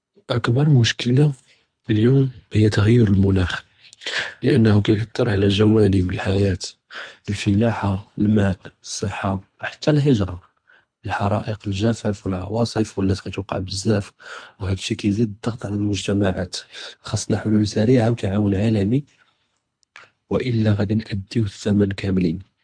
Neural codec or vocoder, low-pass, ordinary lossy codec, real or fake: codec, 24 kHz, 3 kbps, HILCodec; 9.9 kHz; MP3, 64 kbps; fake